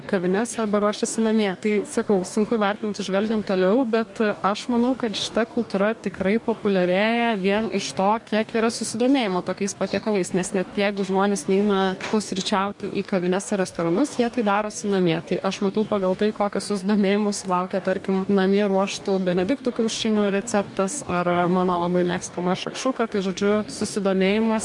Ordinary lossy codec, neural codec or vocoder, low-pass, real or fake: MP3, 64 kbps; codec, 44.1 kHz, 2.6 kbps, DAC; 10.8 kHz; fake